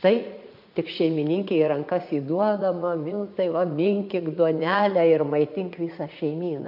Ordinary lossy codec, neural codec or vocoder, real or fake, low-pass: AAC, 48 kbps; vocoder, 44.1 kHz, 80 mel bands, Vocos; fake; 5.4 kHz